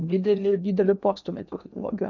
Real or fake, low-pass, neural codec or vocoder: fake; 7.2 kHz; codec, 16 kHz in and 24 kHz out, 0.8 kbps, FocalCodec, streaming, 65536 codes